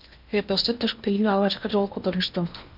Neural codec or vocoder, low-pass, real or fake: codec, 16 kHz in and 24 kHz out, 0.8 kbps, FocalCodec, streaming, 65536 codes; 5.4 kHz; fake